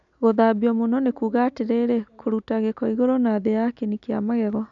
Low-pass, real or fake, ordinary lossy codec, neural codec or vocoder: 7.2 kHz; real; none; none